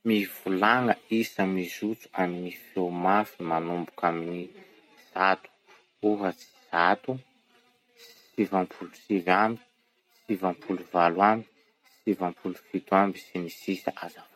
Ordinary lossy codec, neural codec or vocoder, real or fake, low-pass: MP3, 64 kbps; none; real; 19.8 kHz